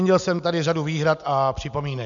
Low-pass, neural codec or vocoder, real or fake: 7.2 kHz; none; real